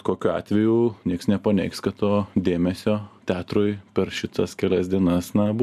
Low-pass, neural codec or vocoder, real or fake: 14.4 kHz; none; real